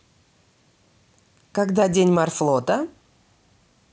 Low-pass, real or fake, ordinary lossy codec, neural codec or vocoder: none; real; none; none